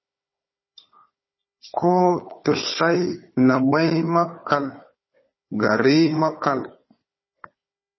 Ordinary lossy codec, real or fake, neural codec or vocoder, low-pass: MP3, 24 kbps; fake; codec, 16 kHz, 4 kbps, FunCodec, trained on Chinese and English, 50 frames a second; 7.2 kHz